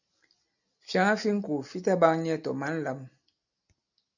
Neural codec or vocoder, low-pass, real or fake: none; 7.2 kHz; real